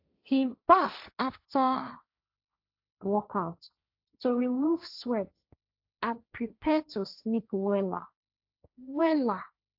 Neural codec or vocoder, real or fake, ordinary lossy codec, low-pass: codec, 16 kHz, 1.1 kbps, Voila-Tokenizer; fake; AAC, 48 kbps; 5.4 kHz